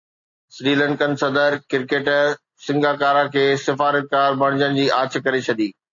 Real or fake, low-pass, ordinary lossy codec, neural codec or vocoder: real; 7.2 kHz; AAC, 64 kbps; none